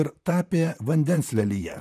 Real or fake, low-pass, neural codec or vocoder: fake; 14.4 kHz; vocoder, 44.1 kHz, 128 mel bands, Pupu-Vocoder